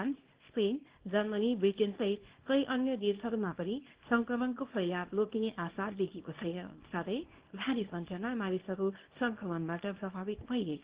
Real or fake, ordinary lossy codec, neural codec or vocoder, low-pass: fake; Opus, 16 kbps; codec, 24 kHz, 0.9 kbps, WavTokenizer, small release; 3.6 kHz